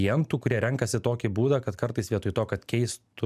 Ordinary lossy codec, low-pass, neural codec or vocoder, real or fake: MP3, 96 kbps; 14.4 kHz; none; real